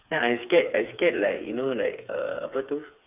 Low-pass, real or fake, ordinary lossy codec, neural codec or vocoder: 3.6 kHz; fake; none; codec, 16 kHz, 4 kbps, FreqCodec, smaller model